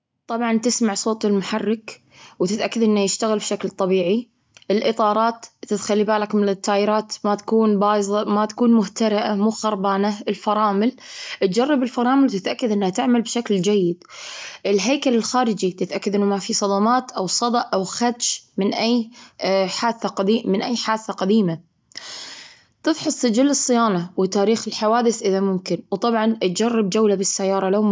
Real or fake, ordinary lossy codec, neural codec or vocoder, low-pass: real; none; none; none